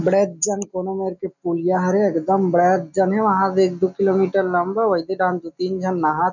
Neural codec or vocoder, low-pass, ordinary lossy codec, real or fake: none; 7.2 kHz; none; real